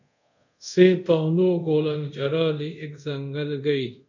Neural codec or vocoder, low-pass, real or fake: codec, 24 kHz, 0.5 kbps, DualCodec; 7.2 kHz; fake